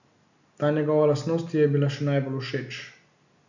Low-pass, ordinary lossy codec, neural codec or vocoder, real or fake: 7.2 kHz; none; none; real